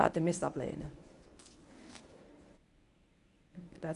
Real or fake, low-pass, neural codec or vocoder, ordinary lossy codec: fake; 10.8 kHz; codec, 24 kHz, 0.9 kbps, WavTokenizer, medium speech release version 1; none